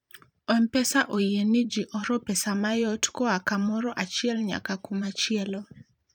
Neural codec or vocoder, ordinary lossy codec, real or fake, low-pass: vocoder, 44.1 kHz, 128 mel bands every 512 samples, BigVGAN v2; none; fake; 19.8 kHz